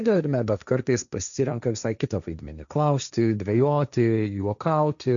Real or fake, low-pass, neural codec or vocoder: fake; 7.2 kHz; codec, 16 kHz, 1.1 kbps, Voila-Tokenizer